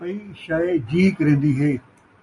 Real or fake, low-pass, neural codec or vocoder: real; 10.8 kHz; none